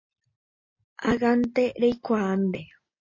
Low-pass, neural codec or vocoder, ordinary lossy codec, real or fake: 7.2 kHz; none; MP3, 32 kbps; real